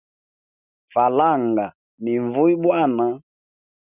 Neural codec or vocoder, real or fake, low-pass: none; real; 3.6 kHz